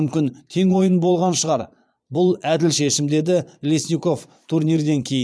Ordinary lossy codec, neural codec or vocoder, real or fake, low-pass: none; vocoder, 22.05 kHz, 80 mel bands, Vocos; fake; none